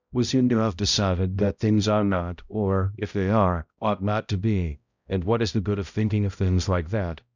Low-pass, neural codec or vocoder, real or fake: 7.2 kHz; codec, 16 kHz, 0.5 kbps, X-Codec, HuBERT features, trained on balanced general audio; fake